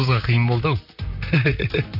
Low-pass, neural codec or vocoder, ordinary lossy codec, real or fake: 5.4 kHz; vocoder, 44.1 kHz, 128 mel bands, Pupu-Vocoder; none; fake